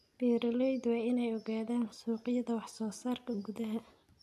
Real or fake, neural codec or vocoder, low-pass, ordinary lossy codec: real; none; 14.4 kHz; MP3, 96 kbps